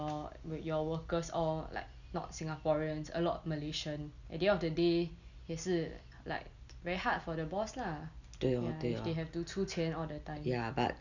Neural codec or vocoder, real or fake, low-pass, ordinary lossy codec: none; real; 7.2 kHz; none